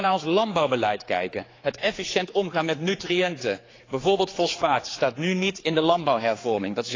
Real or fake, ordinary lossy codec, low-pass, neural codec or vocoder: fake; AAC, 32 kbps; 7.2 kHz; codec, 16 kHz, 4 kbps, X-Codec, HuBERT features, trained on general audio